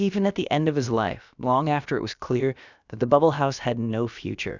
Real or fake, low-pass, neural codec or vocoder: fake; 7.2 kHz; codec, 16 kHz, about 1 kbps, DyCAST, with the encoder's durations